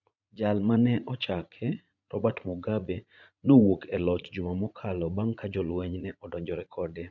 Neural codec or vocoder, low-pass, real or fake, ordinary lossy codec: vocoder, 44.1 kHz, 80 mel bands, Vocos; 7.2 kHz; fake; none